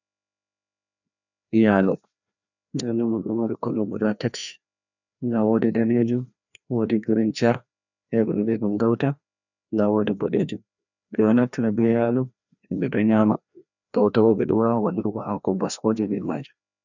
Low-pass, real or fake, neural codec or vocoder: 7.2 kHz; fake; codec, 16 kHz, 1 kbps, FreqCodec, larger model